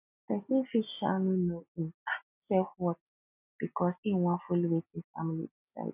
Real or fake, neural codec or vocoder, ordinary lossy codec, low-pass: real; none; none; 3.6 kHz